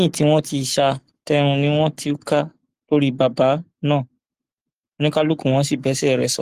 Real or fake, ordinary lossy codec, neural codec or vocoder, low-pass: fake; Opus, 24 kbps; codec, 44.1 kHz, 7.8 kbps, Pupu-Codec; 14.4 kHz